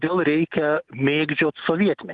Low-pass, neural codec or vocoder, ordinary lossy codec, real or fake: 10.8 kHz; none; Opus, 24 kbps; real